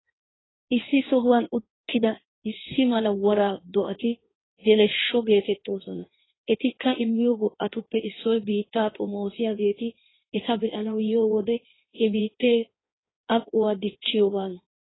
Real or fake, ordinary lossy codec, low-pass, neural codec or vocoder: fake; AAC, 16 kbps; 7.2 kHz; codec, 16 kHz in and 24 kHz out, 1.1 kbps, FireRedTTS-2 codec